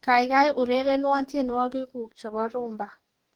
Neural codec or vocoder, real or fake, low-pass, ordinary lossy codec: codec, 44.1 kHz, 2.6 kbps, DAC; fake; 19.8 kHz; Opus, 24 kbps